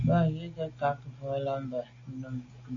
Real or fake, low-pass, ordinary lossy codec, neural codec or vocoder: real; 7.2 kHz; MP3, 48 kbps; none